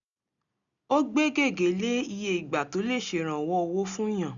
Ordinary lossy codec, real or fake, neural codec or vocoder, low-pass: none; real; none; 7.2 kHz